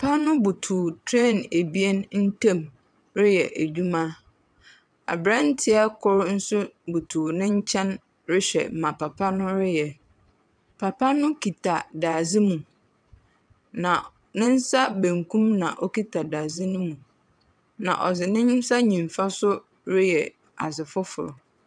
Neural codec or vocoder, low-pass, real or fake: vocoder, 22.05 kHz, 80 mel bands, WaveNeXt; 9.9 kHz; fake